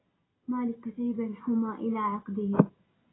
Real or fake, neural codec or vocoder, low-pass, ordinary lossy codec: real; none; 7.2 kHz; AAC, 16 kbps